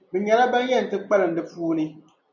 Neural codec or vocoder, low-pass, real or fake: none; 7.2 kHz; real